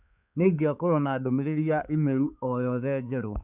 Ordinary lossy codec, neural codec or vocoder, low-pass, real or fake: none; codec, 16 kHz, 4 kbps, X-Codec, HuBERT features, trained on balanced general audio; 3.6 kHz; fake